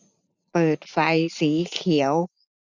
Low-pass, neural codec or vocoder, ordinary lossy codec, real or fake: 7.2 kHz; vocoder, 44.1 kHz, 80 mel bands, Vocos; none; fake